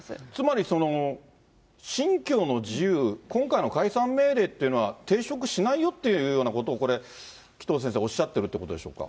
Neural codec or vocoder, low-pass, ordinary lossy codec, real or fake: none; none; none; real